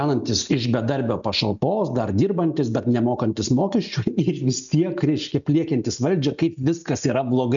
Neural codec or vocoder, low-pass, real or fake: none; 7.2 kHz; real